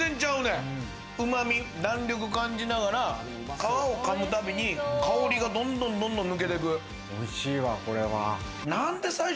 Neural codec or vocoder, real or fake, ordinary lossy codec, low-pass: none; real; none; none